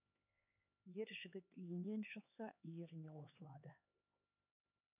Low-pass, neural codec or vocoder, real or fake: 3.6 kHz; codec, 16 kHz, 4 kbps, X-Codec, HuBERT features, trained on LibriSpeech; fake